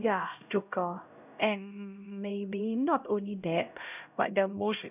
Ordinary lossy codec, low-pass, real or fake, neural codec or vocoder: none; 3.6 kHz; fake; codec, 16 kHz, 0.5 kbps, X-Codec, HuBERT features, trained on LibriSpeech